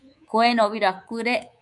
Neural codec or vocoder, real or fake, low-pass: codec, 24 kHz, 3.1 kbps, DualCodec; fake; 10.8 kHz